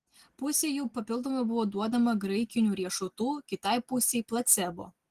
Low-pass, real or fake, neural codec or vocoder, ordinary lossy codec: 14.4 kHz; real; none; Opus, 16 kbps